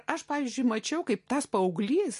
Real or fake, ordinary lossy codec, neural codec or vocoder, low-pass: real; MP3, 48 kbps; none; 14.4 kHz